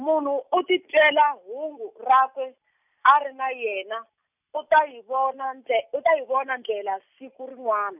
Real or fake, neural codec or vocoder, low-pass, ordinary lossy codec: real; none; 3.6 kHz; none